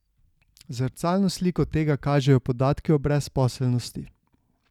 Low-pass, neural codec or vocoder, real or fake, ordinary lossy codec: 19.8 kHz; none; real; none